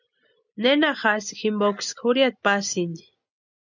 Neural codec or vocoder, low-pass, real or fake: none; 7.2 kHz; real